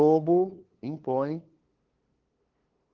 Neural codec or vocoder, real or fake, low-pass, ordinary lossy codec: codec, 16 kHz, 2 kbps, FunCodec, trained on LibriTTS, 25 frames a second; fake; 7.2 kHz; Opus, 16 kbps